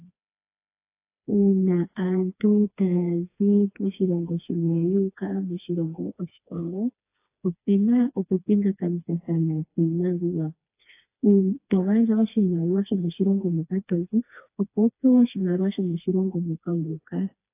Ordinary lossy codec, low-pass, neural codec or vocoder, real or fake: AAC, 24 kbps; 3.6 kHz; codec, 16 kHz, 2 kbps, FreqCodec, smaller model; fake